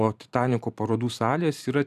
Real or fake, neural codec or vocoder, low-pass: fake; vocoder, 44.1 kHz, 128 mel bands every 512 samples, BigVGAN v2; 14.4 kHz